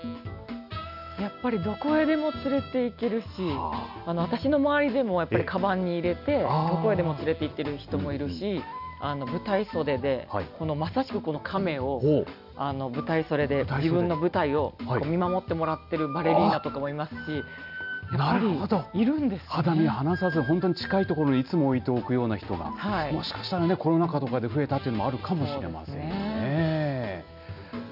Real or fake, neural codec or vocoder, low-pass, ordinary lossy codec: real; none; 5.4 kHz; none